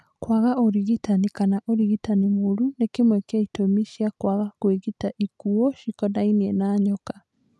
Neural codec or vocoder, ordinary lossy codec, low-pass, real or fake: none; none; none; real